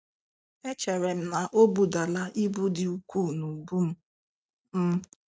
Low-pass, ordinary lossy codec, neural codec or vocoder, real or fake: none; none; none; real